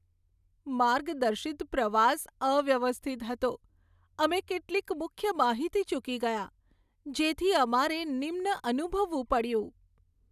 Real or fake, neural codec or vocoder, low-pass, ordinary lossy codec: real; none; 14.4 kHz; none